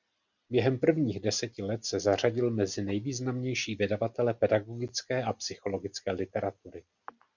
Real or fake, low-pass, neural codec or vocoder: real; 7.2 kHz; none